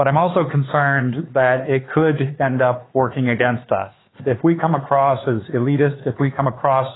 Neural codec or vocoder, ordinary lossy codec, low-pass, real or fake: codec, 16 kHz, 4 kbps, X-Codec, HuBERT features, trained on LibriSpeech; AAC, 16 kbps; 7.2 kHz; fake